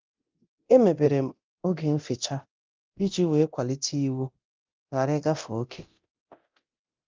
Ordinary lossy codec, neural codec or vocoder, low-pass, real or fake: Opus, 24 kbps; codec, 24 kHz, 0.9 kbps, DualCodec; 7.2 kHz; fake